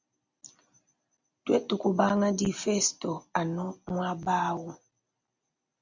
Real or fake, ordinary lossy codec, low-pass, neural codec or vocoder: real; Opus, 64 kbps; 7.2 kHz; none